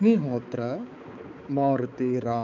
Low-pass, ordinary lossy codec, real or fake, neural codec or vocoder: 7.2 kHz; none; fake; codec, 16 kHz, 4 kbps, X-Codec, HuBERT features, trained on balanced general audio